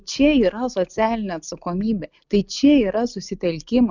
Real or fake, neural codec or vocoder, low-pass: real; none; 7.2 kHz